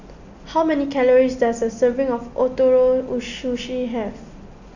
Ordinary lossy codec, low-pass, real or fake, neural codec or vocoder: none; 7.2 kHz; real; none